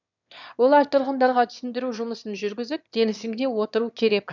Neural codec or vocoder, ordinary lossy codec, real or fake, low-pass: autoencoder, 22.05 kHz, a latent of 192 numbers a frame, VITS, trained on one speaker; none; fake; 7.2 kHz